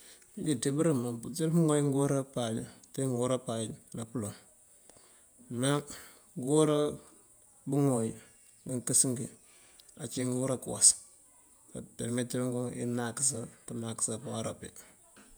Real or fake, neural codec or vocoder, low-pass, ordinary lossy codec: fake; vocoder, 48 kHz, 128 mel bands, Vocos; none; none